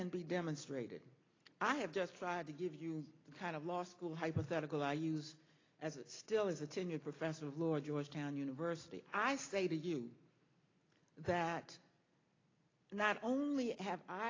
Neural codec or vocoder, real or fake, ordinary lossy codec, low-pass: none; real; AAC, 32 kbps; 7.2 kHz